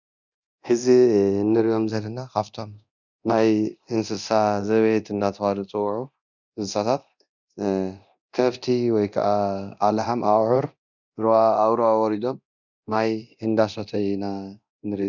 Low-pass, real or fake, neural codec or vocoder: 7.2 kHz; fake; codec, 24 kHz, 0.9 kbps, DualCodec